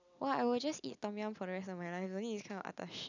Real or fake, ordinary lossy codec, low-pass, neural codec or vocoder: real; none; 7.2 kHz; none